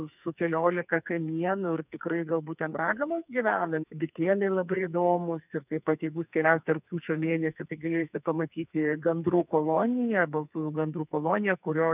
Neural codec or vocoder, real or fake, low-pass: codec, 44.1 kHz, 2.6 kbps, SNAC; fake; 3.6 kHz